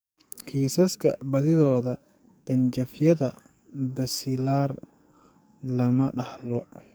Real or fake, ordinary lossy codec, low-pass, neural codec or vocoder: fake; none; none; codec, 44.1 kHz, 2.6 kbps, SNAC